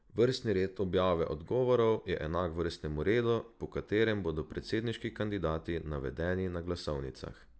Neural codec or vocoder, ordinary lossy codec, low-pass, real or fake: none; none; none; real